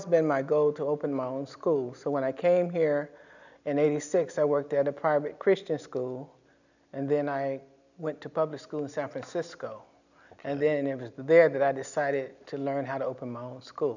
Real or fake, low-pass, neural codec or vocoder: real; 7.2 kHz; none